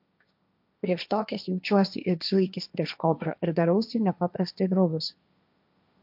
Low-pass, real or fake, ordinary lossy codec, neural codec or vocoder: 5.4 kHz; fake; MP3, 48 kbps; codec, 16 kHz, 1.1 kbps, Voila-Tokenizer